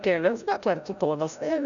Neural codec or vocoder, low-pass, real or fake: codec, 16 kHz, 0.5 kbps, FreqCodec, larger model; 7.2 kHz; fake